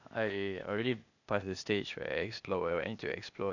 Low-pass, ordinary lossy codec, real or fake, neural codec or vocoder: 7.2 kHz; none; fake; codec, 16 kHz, 0.8 kbps, ZipCodec